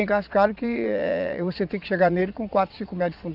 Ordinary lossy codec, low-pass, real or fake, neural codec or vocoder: none; 5.4 kHz; fake; vocoder, 22.05 kHz, 80 mel bands, Vocos